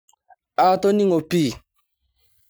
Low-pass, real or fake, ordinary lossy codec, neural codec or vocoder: none; real; none; none